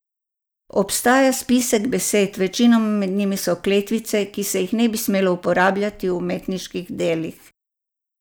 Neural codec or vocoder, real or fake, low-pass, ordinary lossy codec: none; real; none; none